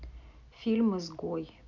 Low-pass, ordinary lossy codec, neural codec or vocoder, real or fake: 7.2 kHz; none; none; real